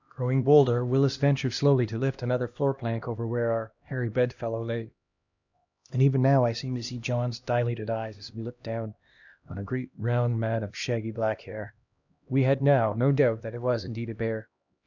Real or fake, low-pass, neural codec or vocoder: fake; 7.2 kHz; codec, 16 kHz, 1 kbps, X-Codec, HuBERT features, trained on LibriSpeech